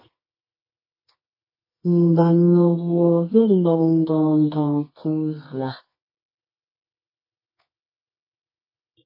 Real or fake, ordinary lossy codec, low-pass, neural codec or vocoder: fake; MP3, 24 kbps; 5.4 kHz; codec, 24 kHz, 0.9 kbps, WavTokenizer, medium music audio release